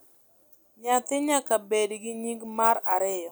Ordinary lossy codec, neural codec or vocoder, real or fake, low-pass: none; none; real; none